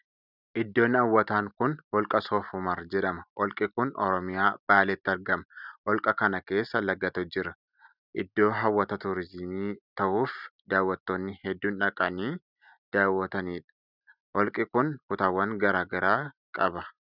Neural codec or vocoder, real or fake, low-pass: none; real; 5.4 kHz